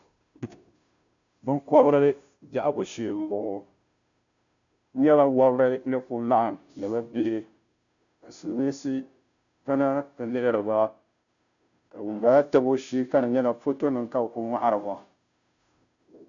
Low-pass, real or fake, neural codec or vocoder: 7.2 kHz; fake; codec, 16 kHz, 0.5 kbps, FunCodec, trained on Chinese and English, 25 frames a second